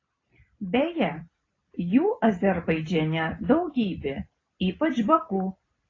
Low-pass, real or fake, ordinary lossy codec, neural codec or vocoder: 7.2 kHz; real; AAC, 32 kbps; none